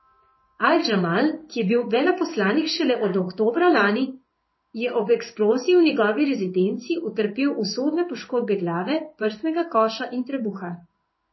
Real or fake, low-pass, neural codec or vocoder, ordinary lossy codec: fake; 7.2 kHz; codec, 16 kHz in and 24 kHz out, 1 kbps, XY-Tokenizer; MP3, 24 kbps